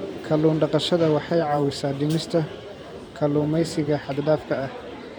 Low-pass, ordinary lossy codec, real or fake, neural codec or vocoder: none; none; fake; vocoder, 44.1 kHz, 128 mel bands every 512 samples, BigVGAN v2